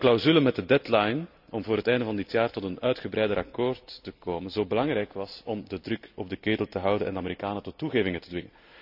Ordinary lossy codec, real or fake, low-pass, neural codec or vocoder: none; real; 5.4 kHz; none